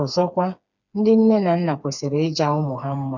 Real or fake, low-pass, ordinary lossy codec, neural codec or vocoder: fake; 7.2 kHz; none; codec, 16 kHz, 4 kbps, FreqCodec, smaller model